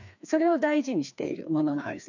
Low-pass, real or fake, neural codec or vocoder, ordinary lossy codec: 7.2 kHz; fake; codec, 16 kHz, 2 kbps, FreqCodec, larger model; none